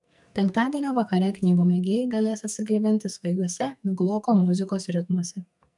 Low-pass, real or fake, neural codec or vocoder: 10.8 kHz; fake; codec, 44.1 kHz, 2.6 kbps, SNAC